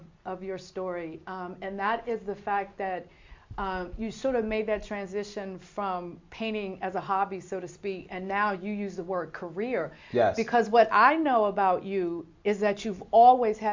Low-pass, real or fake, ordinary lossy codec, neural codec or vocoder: 7.2 kHz; real; MP3, 48 kbps; none